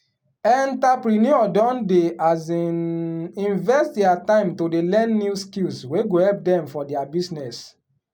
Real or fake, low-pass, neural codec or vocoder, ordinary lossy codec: real; 19.8 kHz; none; none